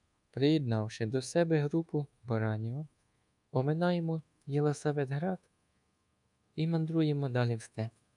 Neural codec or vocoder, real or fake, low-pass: codec, 24 kHz, 1.2 kbps, DualCodec; fake; 10.8 kHz